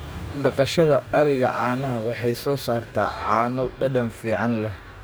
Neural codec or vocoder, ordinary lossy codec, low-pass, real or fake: codec, 44.1 kHz, 2.6 kbps, DAC; none; none; fake